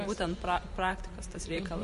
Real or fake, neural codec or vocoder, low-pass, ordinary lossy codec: fake; vocoder, 44.1 kHz, 128 mel bands every 512 samples, BigVGAN v2; 14.4 kHz; MP3, 48 kbps